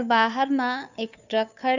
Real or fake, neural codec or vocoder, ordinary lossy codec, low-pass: fake; codec, 16 kHz, 2 kbps, FunCodec, trained on Chinese and English, 25 frames a second; none; 7.2 kHz